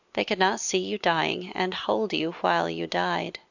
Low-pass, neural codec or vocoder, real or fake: 7.2 kHz; none; real